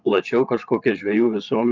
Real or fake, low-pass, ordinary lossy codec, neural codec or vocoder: fake; 7.2 kHz; Opus, 24 kbps; vocoder, 22.05 kHz, 80 mel bands, Vocos